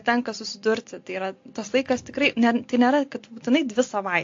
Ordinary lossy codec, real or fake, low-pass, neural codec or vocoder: AAC, 48 kbps; real; 7.2 kHz; none